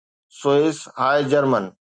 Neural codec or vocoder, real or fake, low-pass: none; real; 9.9 kHz